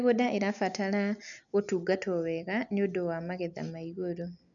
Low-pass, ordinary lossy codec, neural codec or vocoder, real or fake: 7.2 kHz; none; none; real